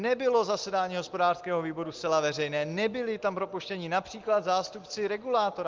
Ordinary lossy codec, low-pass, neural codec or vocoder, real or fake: Opus, 24 kbps; 7.2 kHz; none; real